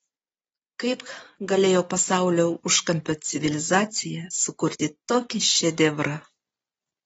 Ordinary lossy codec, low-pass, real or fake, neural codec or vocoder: AAC, 24 kbps; 19.8 kHz; real; none